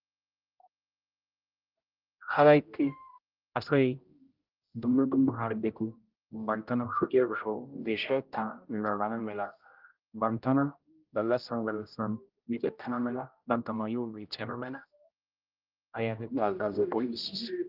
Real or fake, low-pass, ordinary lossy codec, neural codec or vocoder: fake; 5.4 kHz; Opus, 32 kbps; codec, 16 kHz, 0.5 kbps, X-Codec, HuBERT features, trained on general audio